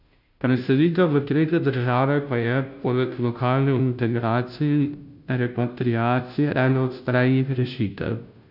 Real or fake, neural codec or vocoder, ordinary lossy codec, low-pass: fake; codec, 16 kHz, 0.5 kbps, FunCodec, trained on Chinese and English, 25 frames a second; none; 5.4 kHz